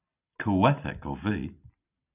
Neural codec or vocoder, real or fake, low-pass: none; real; 3.6 kHz